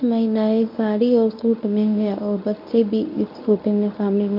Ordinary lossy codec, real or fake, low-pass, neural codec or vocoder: MP3, 48 kbps; fake; 5.4 kHz; codec, 24 kHz, 0.9 kbps, WavTokenizer, medium speech release version 1